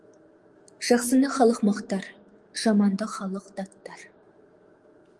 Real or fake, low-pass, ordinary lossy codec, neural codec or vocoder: fake; 10.8 kHz; Opus, 24 kbps; autoencoder, 48 kHz, 128 numbers a frame, DAC-VAE, trained on Japanese speech